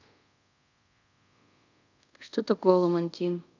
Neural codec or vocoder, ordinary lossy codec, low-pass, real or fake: codec, 24 kHz, 0.5 kbps, DualCodec; none; 7.2 kHz; fake